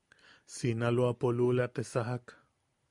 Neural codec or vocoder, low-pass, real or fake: none; 10.8 kHz; real